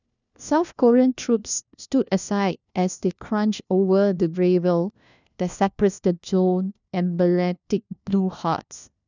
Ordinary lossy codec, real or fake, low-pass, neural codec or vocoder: none; fake; 7.2 kHz; codec, 16 kHz, 1 kbps, FunCodec, trained on LibriTTS, 50 frames a second